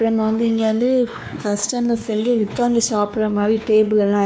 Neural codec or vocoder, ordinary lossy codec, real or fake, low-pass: codec, 16 kHz, 2 kbps, X-Codec, WavLM features, trained on Multilingual LibriSpeech; none; fake; none